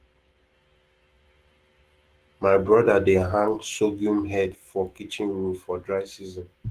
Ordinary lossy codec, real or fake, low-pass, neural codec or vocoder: Opus, 16 kbps; fake; 14.4 kHz; autoencoder, 48 kHz, 128 numbers a frame, DAC-VAE, trained on Japanese speech